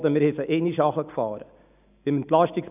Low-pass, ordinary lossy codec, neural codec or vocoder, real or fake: 3.6 kHz; none; none; real